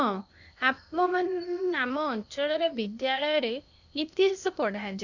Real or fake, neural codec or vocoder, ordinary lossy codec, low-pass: fake; codec, 16 kHz, 0.8 kbps, ZipCodec; none; 7.2 kHz